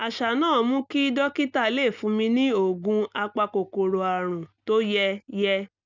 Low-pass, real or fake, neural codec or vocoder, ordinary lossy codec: 7.2 kHz; real; none; none